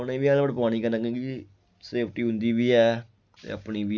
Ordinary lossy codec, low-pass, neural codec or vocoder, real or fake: none; 7.2 kHz; none; real